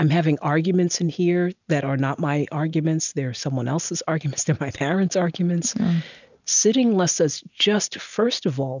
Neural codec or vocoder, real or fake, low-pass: none; real; 7.2 kHz